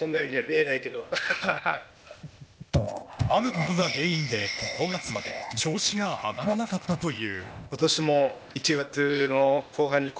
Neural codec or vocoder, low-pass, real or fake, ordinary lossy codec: codec, 16 kHz, 0.8 kbps, ZipCodec; none; fake; none